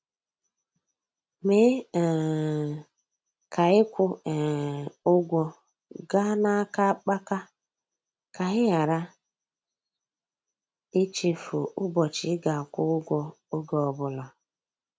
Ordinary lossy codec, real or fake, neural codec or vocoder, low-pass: none; real; none; none